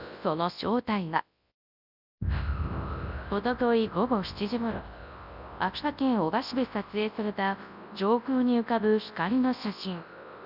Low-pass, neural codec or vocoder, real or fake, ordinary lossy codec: 5.4 kHz; codec, 24 kHz, 0.9 kbps, WavTokenizer, large speech release; fake; none